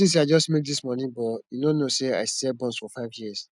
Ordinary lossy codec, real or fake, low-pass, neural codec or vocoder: none; real; 10.8 kHz; none